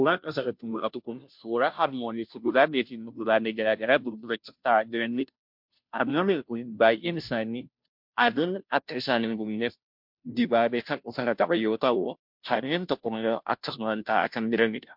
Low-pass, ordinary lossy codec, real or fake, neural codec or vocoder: 5.4 kHz; MP3, 48 kbps; fake; codec, 16 kHz, 0.5 kbps, FunCodec, trained on Chinese and English, 25 frames a second